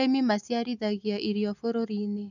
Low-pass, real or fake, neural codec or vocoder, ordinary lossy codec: 7.2 kHz; real; none; none